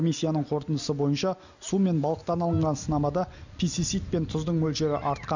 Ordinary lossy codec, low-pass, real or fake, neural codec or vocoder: none; 7.2 kHz; real; none